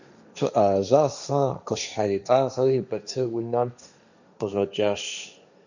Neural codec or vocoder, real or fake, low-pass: codec, 16 kHz, 1.1 kbps, Voila-Tokenizer; fake; 7.2 kHz